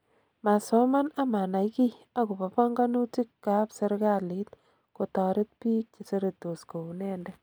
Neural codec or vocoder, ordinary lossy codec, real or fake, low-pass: none; none; real; none